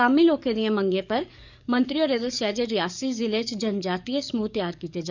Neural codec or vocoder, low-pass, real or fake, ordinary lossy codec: codec, 44.1 kHz, 7.8 kbps, DAC; 7.2 kHz; fake; none